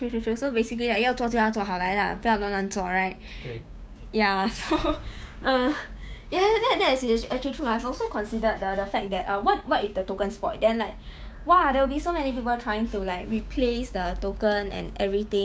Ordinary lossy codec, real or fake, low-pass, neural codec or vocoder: none; fake; none; codec, 16 kHz, 6 kbps, DAC